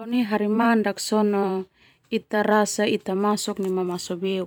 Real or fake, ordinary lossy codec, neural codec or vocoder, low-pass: fake; none; vocoder, 44.1 kHz, 128 mel bands every 512 samples, BigVGAN v2; 19.8 kHz